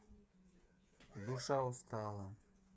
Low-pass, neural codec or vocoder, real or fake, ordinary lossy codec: none; codec, 16 kHz, 8 kbps, FreqCodec, smaller model; fake; none